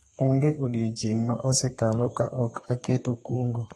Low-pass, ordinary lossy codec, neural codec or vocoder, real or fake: 14.4 kHz; AAC, 32 kbps; codec, 32 kHz, 1.9 kbps, SNAC; fake